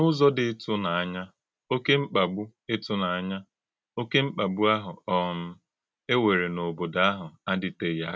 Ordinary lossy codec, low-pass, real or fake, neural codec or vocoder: none; none; real; none